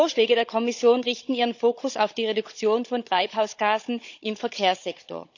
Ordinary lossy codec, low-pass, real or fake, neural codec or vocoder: none; 7.2 kHz; fake; codec, 16 kHz, 16 kbps, FunCodec, trained on Chinese and English, 50 frames a second